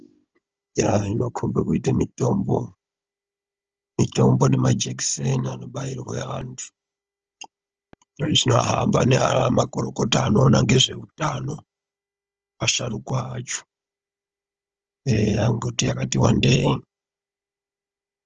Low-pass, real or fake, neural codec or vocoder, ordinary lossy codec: 7.2 kHz; fake; codec, 16 kHz, 16 kbps, FunCodec, trained on Chinese and English, 50 frames a second; Opus, 24 kbps